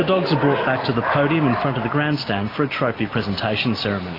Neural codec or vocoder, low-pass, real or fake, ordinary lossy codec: none; 5.4 kHz; real; AAC, 24 kbps